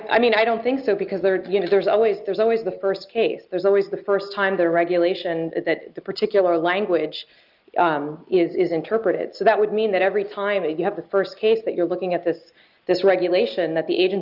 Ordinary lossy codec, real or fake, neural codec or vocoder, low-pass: Opus, 32 kbps; real; none; 5.4 kHz